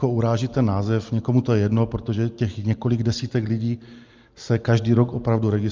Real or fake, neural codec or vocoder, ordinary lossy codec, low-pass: real; none; Opus, 24 kbps; 7.2 kHz